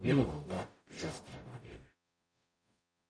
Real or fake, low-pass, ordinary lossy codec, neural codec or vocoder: fake; 9.9 kHz; none; codec, 44.1 kHz, 0.9 kbps, DAC